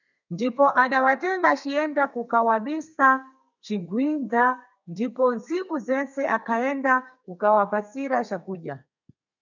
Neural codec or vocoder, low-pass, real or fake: codec, 32 kHz, 1.9 kbps, SNAC; 7.2 kHz; fake